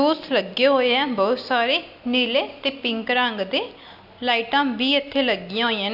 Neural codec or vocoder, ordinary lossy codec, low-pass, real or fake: none; AAC, 48 kbps; 5.4 kHz; real